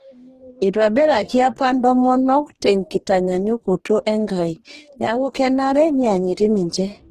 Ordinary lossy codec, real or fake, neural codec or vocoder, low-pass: Opus, 16 kbps; fake; codec, 44.1 kHz, 2.6 kbps, DAC; 14.4 kHz